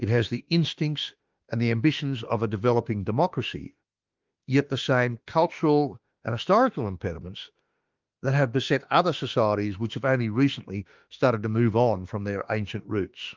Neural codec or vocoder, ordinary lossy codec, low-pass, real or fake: autoencoder, 48 kHz, 32 numbers a frame, DAC-VAE, trained on Japanese speech; Opus, 32 kbps; 7.2 kHz; fake